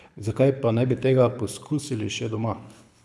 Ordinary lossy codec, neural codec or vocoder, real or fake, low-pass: none; codec, 24 kHz, 6 kbps, HILCodec; fake; none